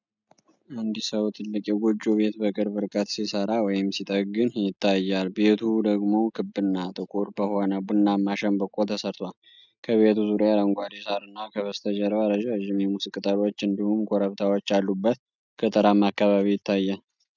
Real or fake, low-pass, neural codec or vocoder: real; 7.2 kHz; none